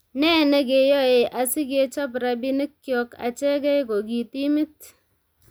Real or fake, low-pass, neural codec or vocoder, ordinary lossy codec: real; none; none; none